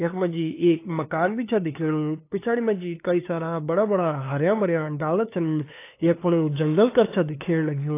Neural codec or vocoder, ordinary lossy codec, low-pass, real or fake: codec, 16 kHz, 2 kbps, FunCodec, trained on LibriTTS, 25 frames a second; AAC, 24 kbps; 3.6 kHz; fake